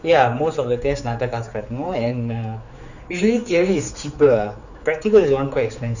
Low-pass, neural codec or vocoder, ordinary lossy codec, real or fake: 7.2 kHz; codec, 16 kHz, 4 kbps, X-Codec, HuBERT features, trained on general audio; none; fake